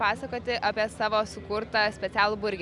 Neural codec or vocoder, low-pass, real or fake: none; 10.8 kHz; real